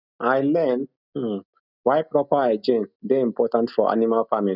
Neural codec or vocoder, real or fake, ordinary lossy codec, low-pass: none; real; none; 5.4 kHz